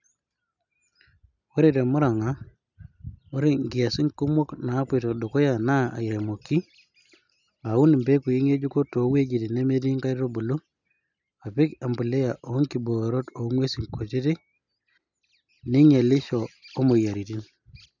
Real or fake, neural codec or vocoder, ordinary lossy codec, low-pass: real; none; none; 7.2 kHz